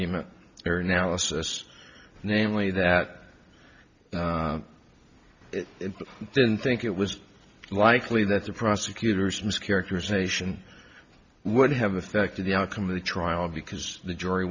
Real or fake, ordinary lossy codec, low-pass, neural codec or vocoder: real; Opus, 64 kbps; 7.2 kHz; none